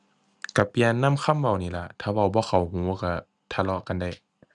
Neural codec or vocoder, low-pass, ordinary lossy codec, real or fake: none; 10.8 kHz; none; real